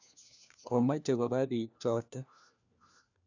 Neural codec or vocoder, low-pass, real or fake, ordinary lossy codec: codec, 16 kHz, 1 kbps, FunCodec, trained on LibriTTS, 50 frames a second; 7.2 kHz; fake; none